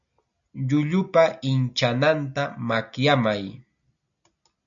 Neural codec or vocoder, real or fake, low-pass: none; real; 7.2 kHz